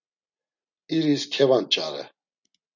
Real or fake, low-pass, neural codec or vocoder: real; 7.2 kHz; none